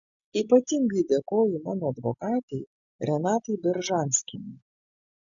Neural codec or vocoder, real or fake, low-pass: none; real; 7.2 kHz